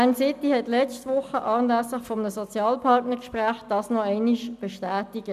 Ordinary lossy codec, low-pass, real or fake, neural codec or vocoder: none; 14.4 kHz; real; none